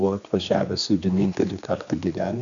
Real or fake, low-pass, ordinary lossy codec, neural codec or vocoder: fake; 7.2 kHz; MP3, 96 kbps; codec, 16 kHz, 2 kbps, X-Codec, HuBERT features, trained on general audio